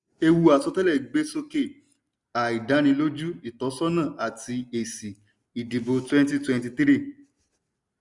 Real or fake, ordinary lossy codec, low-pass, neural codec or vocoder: real; none; 10.8 kHz; none